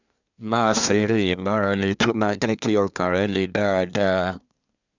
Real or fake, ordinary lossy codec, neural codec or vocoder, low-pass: fake; none; codec, 24 kHz, 1 kbps, SNAC; 7.2 kHz